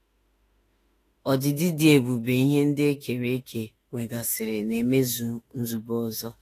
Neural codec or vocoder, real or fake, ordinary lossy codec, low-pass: autoencoder, 48 kHz, 32 numbers a frame, DAC-VAE, trained on Japanese speech; fake; AAC, 48 kbps; 14.4 kHz